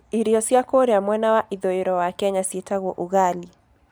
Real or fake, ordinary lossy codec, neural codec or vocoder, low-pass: real; none; none; none